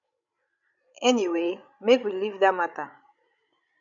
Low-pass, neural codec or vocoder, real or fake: 7.2 kHz; codec, 16 kHz, 16 kbps, FreqCodec, larger model; fake